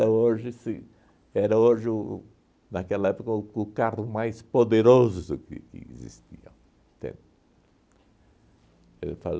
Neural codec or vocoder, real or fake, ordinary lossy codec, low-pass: none; real; none; none